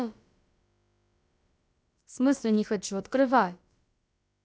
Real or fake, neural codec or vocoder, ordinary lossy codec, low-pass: fake; codec, 16 kHz, about 1 kbps, DyCAST, with the encoder's durations; none; none